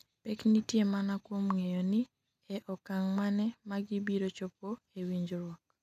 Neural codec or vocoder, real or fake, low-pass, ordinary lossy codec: none; real; 14.4 kHz; none